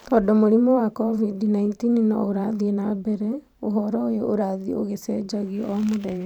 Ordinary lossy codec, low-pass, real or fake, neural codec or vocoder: none; 19.8 kHz; fake; vocoder, 44.1 kHz, 128 mel bands every 512 samples, BigVGAN v2